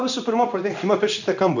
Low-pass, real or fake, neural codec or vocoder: 7.2 kHz; fake; codec, 16 kHz in and 24 kHz out, 1 kbps, XY-Tokenizer